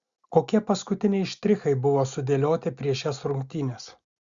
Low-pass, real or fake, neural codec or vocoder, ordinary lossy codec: 7.2 kHz; real; none; Opus, 64 kbps